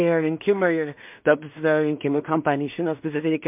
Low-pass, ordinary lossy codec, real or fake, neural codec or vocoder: 3.6 kHz; MP3, 32 kbps; fake; codec, 16 kHz in and 24 kHz out, 0.4 kbps, LongCat-Audio-Codec, two codebook decoder